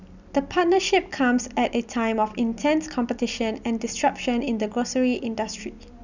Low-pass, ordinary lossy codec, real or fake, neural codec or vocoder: 7.2 kHz; none; real; none